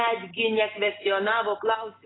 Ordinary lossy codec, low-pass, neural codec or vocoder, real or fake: AAC, 16 kbps; 7.2 kHz; none; real